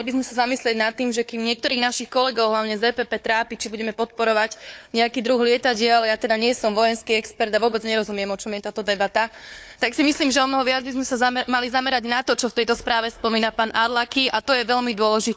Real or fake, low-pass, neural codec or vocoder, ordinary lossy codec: fake; none; codec, 16 kHz, 4 kbps, FunCodec, trained on Chinese and English, 50 frames a second; none